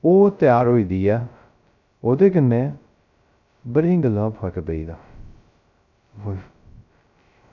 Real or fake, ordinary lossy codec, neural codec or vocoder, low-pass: fake; none; codec, 16 kHz, 0.2 kbps, FocalCodec; 7.2 kHz